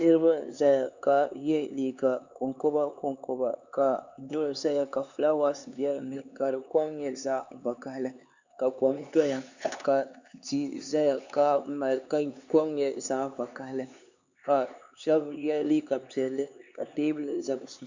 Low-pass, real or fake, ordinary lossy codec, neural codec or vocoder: 7.2 kHz; fake; Opus, 64 kbps; codec, 16 kHz, 4 kbps, X-Codec, HuBERT features, trained on LibriSpeech